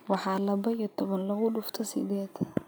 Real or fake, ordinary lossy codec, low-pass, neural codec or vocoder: real; none; none; none